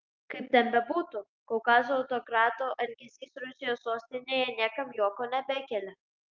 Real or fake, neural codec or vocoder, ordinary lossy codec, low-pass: real; none; Opus, 24 kbps; 7.2 kHz